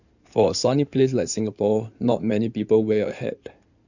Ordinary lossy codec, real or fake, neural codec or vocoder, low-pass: none; fake; codec, 16 kHz in and 24 kHz out, 2.2 kbps, FireRedTTS-2 codec; 7.2 kHz